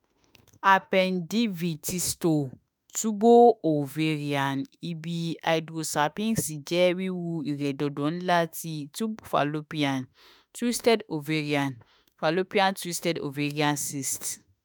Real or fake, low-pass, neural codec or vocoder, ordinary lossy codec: fake; none; autoencoder, 48 kHz, 32 numbers a frame, DAC-VAE, trained on Japanese speech; none